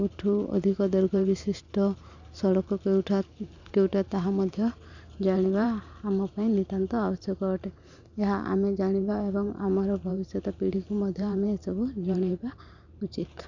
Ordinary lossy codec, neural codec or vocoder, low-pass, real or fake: none; vocoder, 44.1 kHz, 128 mel bands every 512 samples, BigVGAN v2; 7.2 kHz; fake